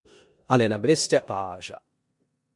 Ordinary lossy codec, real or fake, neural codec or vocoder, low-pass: MP3, 64 kbps; fake; codec, 16 kHz in and 24 kHz out, 0.9 kbps, LongCat-Audio-Codec, four codebook decoder; 10.8 kHz